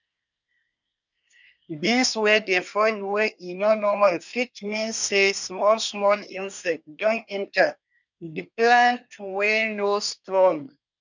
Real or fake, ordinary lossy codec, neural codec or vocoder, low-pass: fake; none; codec, 24 kHz, 1 kbps, SNAC; 7.2 kHz